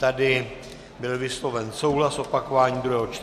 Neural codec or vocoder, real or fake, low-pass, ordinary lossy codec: none; real; 14.4 kHz; MP3, 64 kbps